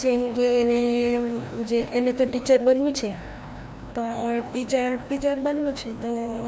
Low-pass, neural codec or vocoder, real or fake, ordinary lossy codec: none; codec, 16 kHz, 1 kbps, FreqCodec, larger model; fake; none